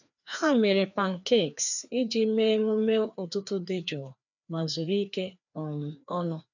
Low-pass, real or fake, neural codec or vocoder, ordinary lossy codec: 7.2 kHz; fake; codec, 16 kHz, 2 kbps, FreqCodec, larger model; none